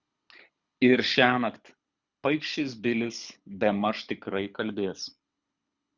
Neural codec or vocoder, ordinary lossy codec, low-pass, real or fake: codec, 24 kHz, 6 kbps, HILCodec; Opus, 64 kbps; 7.2 kHz; fake